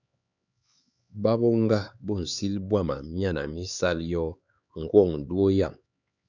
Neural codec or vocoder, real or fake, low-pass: codec, 16 kHz, 4 kbps, X-Codec, HuBERT features, trained on LibriSpeech; fake; 7.2 kHz